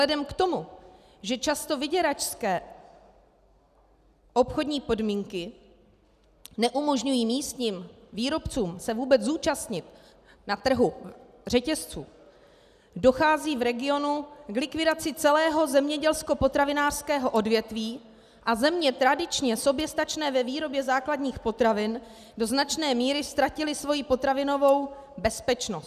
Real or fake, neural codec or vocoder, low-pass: real; none; 14.4 kHz